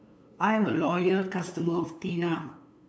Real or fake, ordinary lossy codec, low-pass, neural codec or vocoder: fake; none; none; codec, 16 kHz, 2 kbps, FunCodec, trained on LibriTTS, 25 frames a second